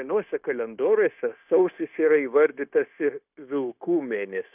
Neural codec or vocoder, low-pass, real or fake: codec, 16 kHz, 0.9 kbps, LongCat-Audio-Codec; 3.6 kHz; fake